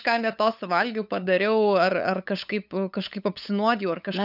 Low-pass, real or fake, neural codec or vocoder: 5.4 kHz; fake; codec, 16 kHz, 8 kbps, FunCodec, trained on LibriTTS, 25 frames a second